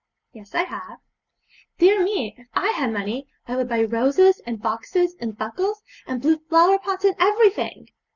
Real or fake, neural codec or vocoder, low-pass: real; none; 7.2 kHz